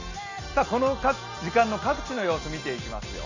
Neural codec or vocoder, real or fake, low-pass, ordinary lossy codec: none; real; 7.2 kHz; none